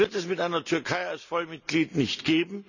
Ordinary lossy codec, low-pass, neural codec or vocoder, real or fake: none; 7.2 kHz; none; real